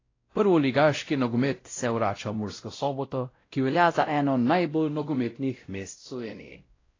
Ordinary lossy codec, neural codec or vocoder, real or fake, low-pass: AAC, 32 kbps; codec, 16 kHz, 0.5 kbps, X-Codec, WavLM features, trained on Multilingual LibriSpeech; fake; 7.2 kHz